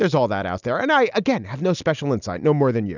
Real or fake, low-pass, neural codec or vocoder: real; 7.2 kHz; none